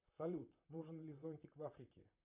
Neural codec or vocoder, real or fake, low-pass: vocoder, 44.1 kHz, 128 mel bands, Pupu-Vocoder; fake; 3.6 kHz